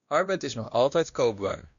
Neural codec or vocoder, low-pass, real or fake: codec, 16 kHz, 1 kbps, X-Codec, WavLM features, trained on Multilingual LibriSpeech; 7.2 kHz; fake